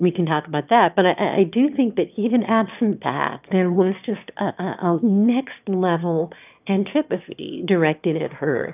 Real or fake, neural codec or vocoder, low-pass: fake; autoencoder, 22.05 kHz, a latent of 192 numbers a frame, VITS, trained on one speaker; 3.6 kHz